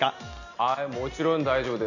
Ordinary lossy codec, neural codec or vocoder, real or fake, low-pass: none; none; real; 7.2 kHz